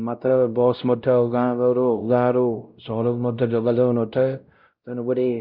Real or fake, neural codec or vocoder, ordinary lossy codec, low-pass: fake; codec, 16 kHz, 0.5 kbps, X-Codec, WavLM features, trained on Multilingual LibriSpeech; Opus, 24 kbps; 5.4 kHz